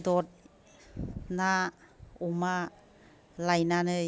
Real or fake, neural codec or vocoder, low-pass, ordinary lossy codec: real; none; none; none